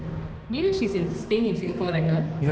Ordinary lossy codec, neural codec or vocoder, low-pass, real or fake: none; codec, 16 kHz, 2 kbps, X-Codec, HuBERT features, trained on balanced general audio; none; fake